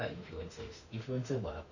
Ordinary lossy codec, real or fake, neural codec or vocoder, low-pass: none; fake; autoencoder, 48 kHz, 32 numbers a frame, DAC-VAE, trained on Japanese speech; 7.2 kHz